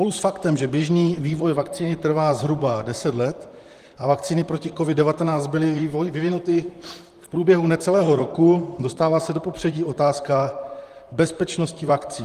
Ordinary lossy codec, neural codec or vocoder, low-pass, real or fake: Opus, 24 kbps; vocoder, 44.1 kHz, 128 mel bands, Pupu-Vocoder; 14.4 kHz; fake